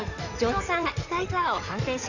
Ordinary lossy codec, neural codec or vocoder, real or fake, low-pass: none; codec, 16 kHz in and 24 kHz out, 2.2 kbps, FireRedTTS-2 codec; fake; 7.2 kHz